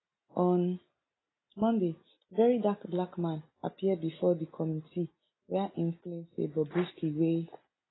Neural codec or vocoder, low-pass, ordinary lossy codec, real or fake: none; 7.2 kHz; AAC, 16 kbps; real